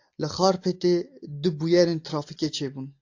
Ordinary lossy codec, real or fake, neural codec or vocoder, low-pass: AAC, 48 kbps; real; none; 7.2 kHz